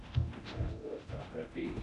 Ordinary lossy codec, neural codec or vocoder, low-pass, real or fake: none; codec, 24 kHz, 0.9 kbps, DualCodec; 10.8 kHz; fake